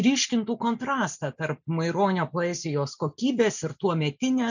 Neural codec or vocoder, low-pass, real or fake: none; 7.2 kHz; real